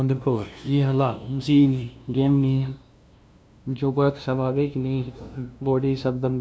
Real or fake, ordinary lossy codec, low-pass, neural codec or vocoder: fake; none; none; codec, 16 kHz, 0.5 kbps, FunCodec, trained on LibriTTS, 25 frames a second